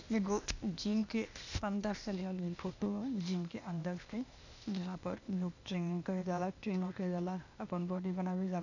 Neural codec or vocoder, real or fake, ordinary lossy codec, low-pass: codec, 16 kHz, 0.8 kbps, ZipCodec; fake; none; 7.2 kHz